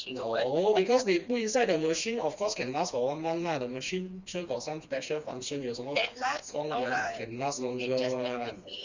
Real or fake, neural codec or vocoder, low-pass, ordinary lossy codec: fake; codec, 16 kHz, 2 kbps, FreqCodec, smaller model; 7.2 kHz; Opus, 64 kbps